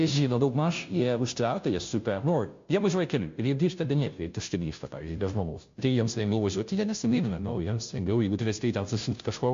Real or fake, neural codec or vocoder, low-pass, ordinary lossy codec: fake; codec, 16 kHz, 0.5 kbps, FunCodec, trained on Chinese and English, 25 frames a second; 7.2 kHz; MP3, 48 kbps